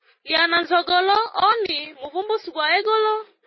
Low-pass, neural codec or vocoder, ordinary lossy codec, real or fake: 7.2 kHz; none; MP3, 24 kbps; real